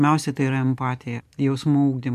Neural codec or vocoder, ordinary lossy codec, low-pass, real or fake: none; MP3, 96 kbps; 14.4 kHz; real